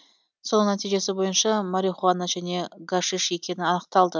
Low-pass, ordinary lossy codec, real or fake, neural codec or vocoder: none; none; real; none